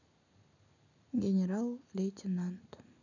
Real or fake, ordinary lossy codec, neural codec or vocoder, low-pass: real; none; none; 7.2 kHz